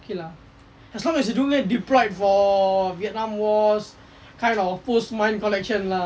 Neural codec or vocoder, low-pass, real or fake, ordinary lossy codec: none; none; real; none